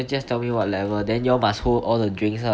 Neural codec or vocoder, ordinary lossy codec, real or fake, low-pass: none; none; real; none